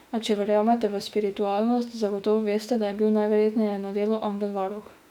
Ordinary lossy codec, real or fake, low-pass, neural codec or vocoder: none; fake; 19.8 kHz; autoencoder, 48 kHz, 32 numbers a frame, DAC-VAE, trained on Japanese speech